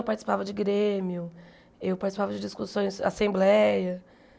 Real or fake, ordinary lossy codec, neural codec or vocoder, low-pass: real; none; none; none